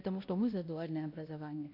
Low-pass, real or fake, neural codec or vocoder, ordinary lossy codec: 5.4 kHz; fake; codec, 16 kHz, 1 kbps, X-Codec, WavLM features, trained on Multilingual LibriSpeech; AAC, 32 kbps